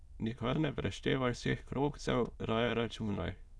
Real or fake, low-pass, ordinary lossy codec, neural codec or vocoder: fake; none; none; autoencoder, 22.05 kHz, a latent of 192 numbers a frame, VITS, trained on many speakers